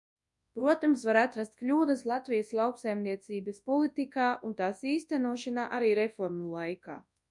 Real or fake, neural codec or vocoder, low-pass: fake; codec, 24 kHz, 0.9 kbps, WavTokenizer, large speech release; 10.8 kHz